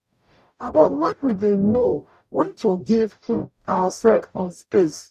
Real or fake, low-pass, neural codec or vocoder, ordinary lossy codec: fake; 14.4 kHz; codec, 44.1 kHz, 0.9 kbps, DAC; none